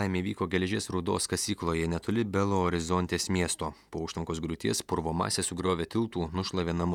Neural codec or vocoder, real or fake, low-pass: vocoder, 48 kHz, 128 mel bands, Vocos; fake; 19.8 kHz